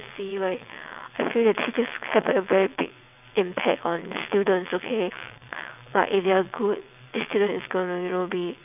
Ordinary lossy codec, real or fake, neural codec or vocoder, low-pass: none; fake; vocoder, 22.05 kHz, 80 mel bands, WaveNeXt; 3.6 kHz